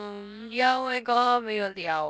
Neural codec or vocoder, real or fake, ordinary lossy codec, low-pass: codec, 16 kHz, about 1 kbps, DyCAST, with the encoder's durations; fake; none; none